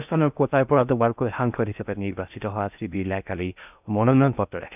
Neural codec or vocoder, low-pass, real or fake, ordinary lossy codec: codec, 16 kHz in and 24 kHz out, 0.6 kbps, FocalCodec, streaming, 2048 codes; 3.6 kHz; fake; none